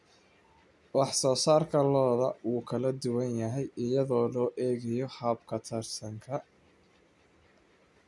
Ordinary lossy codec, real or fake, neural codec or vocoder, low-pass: none; real; none; none